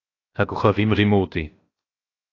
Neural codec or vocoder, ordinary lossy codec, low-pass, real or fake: codec, 16 kHz, 0.7 kbps, FocalCodec; AAC, 32 kbps; 7.2 kHz; fake